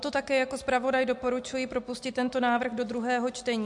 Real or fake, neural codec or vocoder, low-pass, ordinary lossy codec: real; none; 10.8 kHz; MP3, 64 kbps